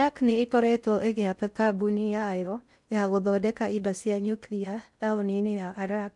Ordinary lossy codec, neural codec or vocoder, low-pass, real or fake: none; codec, 16 kHz in and 24 kHz out, 0.6 kbps, FocalCodec, streaming, 4096 codes; 10.8 kHz; fake